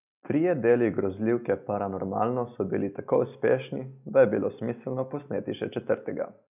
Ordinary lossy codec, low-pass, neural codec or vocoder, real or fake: none; 3.6 kHz; none; real